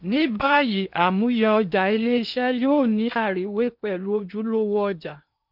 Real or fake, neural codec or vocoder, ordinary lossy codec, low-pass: fake; codec, 16 kHz in and 24 kHz out, 0.8 kbps, FocalCodec, streaming, 65536 codes; none; 5.4 kHz